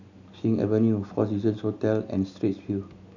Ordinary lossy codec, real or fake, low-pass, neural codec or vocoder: none; real; 7.2 kHz; none